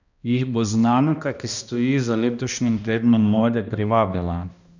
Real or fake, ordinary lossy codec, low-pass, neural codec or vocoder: fake; none; 7.2 kHz; codec, 16 kHz, 1 kbps, X-Codec, HuBERT features, trained on balanced general audio